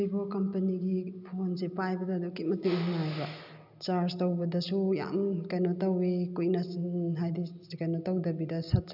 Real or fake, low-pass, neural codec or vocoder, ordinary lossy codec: real; 5.4 kHz; none; none